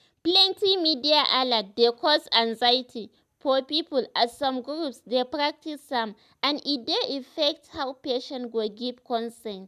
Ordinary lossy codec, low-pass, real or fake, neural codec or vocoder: none; 14.4 kHz; real; none